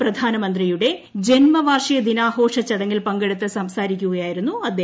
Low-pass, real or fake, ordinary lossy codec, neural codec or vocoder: none; real; none; none